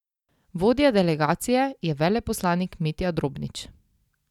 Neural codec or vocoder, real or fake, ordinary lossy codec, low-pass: none; real; none; 19.8 kHz